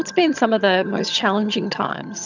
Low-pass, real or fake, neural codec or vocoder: 7.2 kHz; fake; vocoder, 22.05 kHz, 80 mel bands, HiFi-GAN